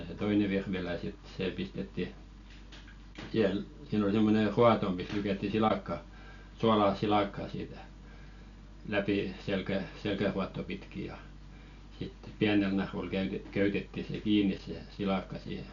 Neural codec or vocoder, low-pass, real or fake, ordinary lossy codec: none; 7.2 kHz; real; none